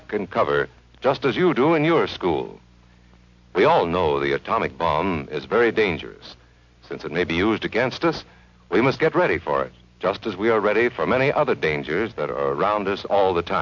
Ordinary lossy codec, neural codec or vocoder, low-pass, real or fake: MP3, 64 kbps; none; 7.2 kHz; real